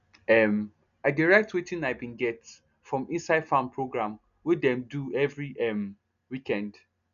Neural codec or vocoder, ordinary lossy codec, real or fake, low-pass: none; none; real; 7.2 kHz